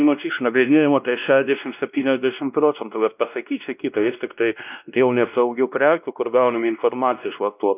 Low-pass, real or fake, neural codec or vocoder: 3.6 kHz; fake; codec, 16 kHz, 1 kbps, X-Codec, WavLM features, trained on Multilingual LibriSpeech